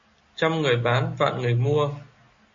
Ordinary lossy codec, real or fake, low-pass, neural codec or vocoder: MP3, 32 kbps; real; 7.2 kHz; none